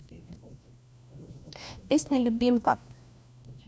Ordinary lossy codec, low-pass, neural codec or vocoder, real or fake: none; none; codec, 16 kHz, 1 kbps, FunCodec, trained on LibriTTS, 50 frames a second; fake